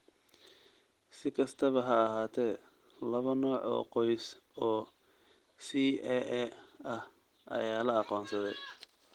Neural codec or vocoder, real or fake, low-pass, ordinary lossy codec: none; real; 19.8 kHz; Opus, 16 kbps